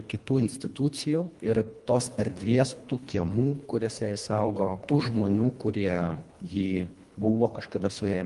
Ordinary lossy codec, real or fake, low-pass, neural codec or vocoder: Opus, 32 kbps; fake; 10.8 kHz; codec, 24 kHz, 1.5 kbps, HILCodec